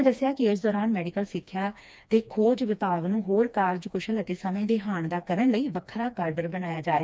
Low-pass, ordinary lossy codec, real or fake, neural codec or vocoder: none; none; fake; codec, 16 kHz, 2 kbps, FreqCodec, smaller model